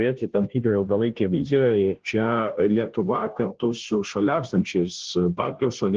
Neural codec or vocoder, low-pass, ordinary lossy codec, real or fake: codec, 16 kHz, 0.5 kbps, FunCodec, trained on Chinese and English, 25 frames a second; 7.2 kHz; Opus, 16 kbps; fake